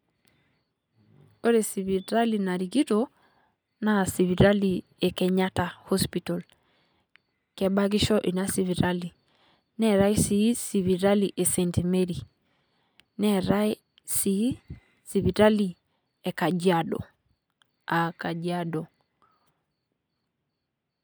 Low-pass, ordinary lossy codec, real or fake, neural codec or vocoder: none; none; real; none